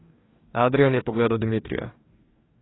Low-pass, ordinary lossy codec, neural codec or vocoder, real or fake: 7.2 kHz; AAC, 16 kbps; codec, 44.1 kHz, 2.6 kbps, DAC; fake